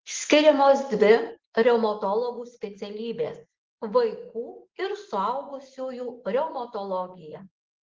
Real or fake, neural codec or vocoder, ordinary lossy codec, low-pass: real; none; Opus, 16 kbps; 7.2 kHz